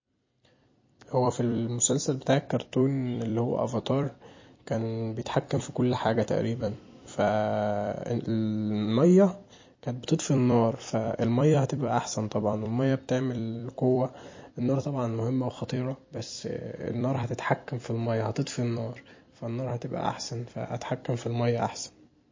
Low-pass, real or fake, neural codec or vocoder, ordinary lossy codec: 7.2 kHz; fake; vocoder, 44.1 kHz, 128 mel bands every 256 samples, BigVGAN v2; MP3, 32 kbps